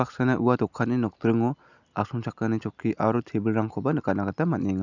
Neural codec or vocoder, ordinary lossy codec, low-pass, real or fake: none; none; 7.2 kHz; real